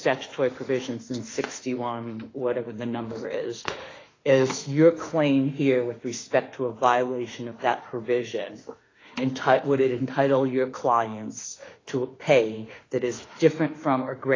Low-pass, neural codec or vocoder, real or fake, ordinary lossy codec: 7.2 kHz; autoencoder, 48 kHz, 32 numbers a frame, DAC-VAE, trained on Japanese speech; fake; AAC, 32 kbps